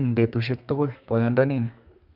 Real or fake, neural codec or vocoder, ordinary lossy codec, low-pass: fake; codec, 32 kHz, 1.9 kbps, SNAC; none; 5.4 kHz